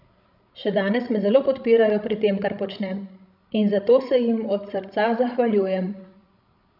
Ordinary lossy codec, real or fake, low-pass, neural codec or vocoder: none; fake; 5.4 kHz; codec, 16 kHz, 16 kbps, FreqCodec, larger model